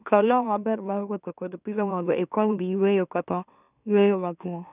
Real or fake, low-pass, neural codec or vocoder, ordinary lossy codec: fake; 3.6 kHz; autoencoder, 44.1 kHz, a latent of 192 numbers a frame, MeloTTS; none